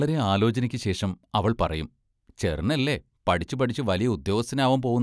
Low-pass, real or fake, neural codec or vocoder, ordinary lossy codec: none; real; none; none